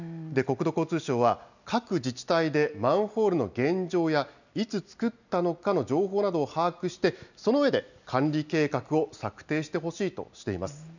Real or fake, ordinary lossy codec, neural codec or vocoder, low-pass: real; none; none; 7.2 kHz